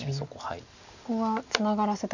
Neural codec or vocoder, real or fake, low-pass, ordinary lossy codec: none; real; 7.2 kHz; none